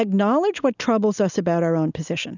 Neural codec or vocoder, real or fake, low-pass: none; real; 7.2 kHz